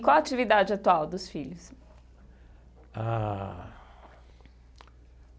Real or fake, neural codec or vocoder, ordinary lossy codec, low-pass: real; none; none; none